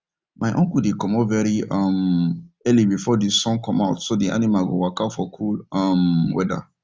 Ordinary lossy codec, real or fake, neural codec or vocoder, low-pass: none; real; none; none